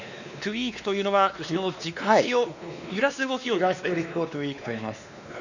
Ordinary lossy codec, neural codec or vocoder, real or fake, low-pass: none; codec, 16 kHz, 2 kbps, X-Codec, WavLM features, trained on Multilingual LibriSpeech; fake; 7.2 kHz